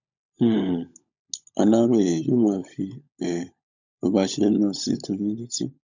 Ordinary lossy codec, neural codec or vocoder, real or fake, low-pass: none; codec, 16 kHz, 16 kbps, FunCodec, trained on LibriTTS, 50 frames a second; fake; 7.2 kHz